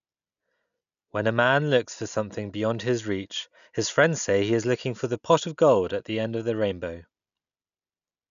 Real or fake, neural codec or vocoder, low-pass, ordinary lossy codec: real; none; 7.2 kHz; none